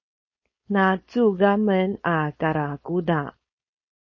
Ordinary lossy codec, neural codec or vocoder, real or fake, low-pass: MP3, 32 kbps; codec, 16 kHz, 4.8 kbps, FACodec; fake; 7.2 kHz